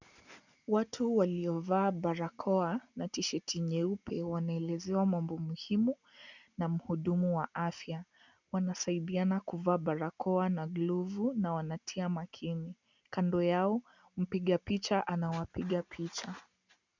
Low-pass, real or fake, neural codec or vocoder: 7.2 kHz; real; none